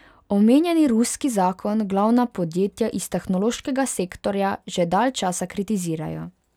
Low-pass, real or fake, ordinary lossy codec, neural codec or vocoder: 19.8 kHz; real; none; none